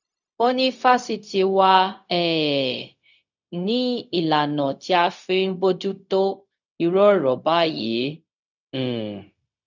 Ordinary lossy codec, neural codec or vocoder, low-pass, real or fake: none; codec, 16 kHz, 0.4 kbps, LongCat-Audio-Codec; 7.2 kHz; fake